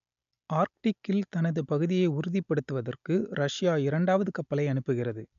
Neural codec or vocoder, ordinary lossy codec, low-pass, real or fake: none; none; 7.2 kHz; real